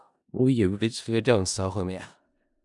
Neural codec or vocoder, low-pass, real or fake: codec, 16 kHz in and 24 kHz out, 0.4 kbps, LongCat-Audio-Codec, four codebook decoder; 10.8 kHz; fake